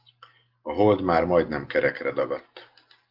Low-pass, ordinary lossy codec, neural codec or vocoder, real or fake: 5.4 kHz; Opus, 32 kbps; none; real